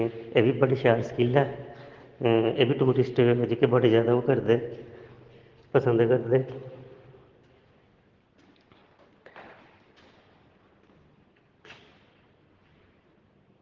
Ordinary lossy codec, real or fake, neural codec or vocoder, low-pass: Opus, 16 kbps; real; none; 7.2 kHz